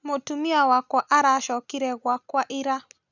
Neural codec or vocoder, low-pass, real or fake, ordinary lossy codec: none; 7.2 kHz; real; none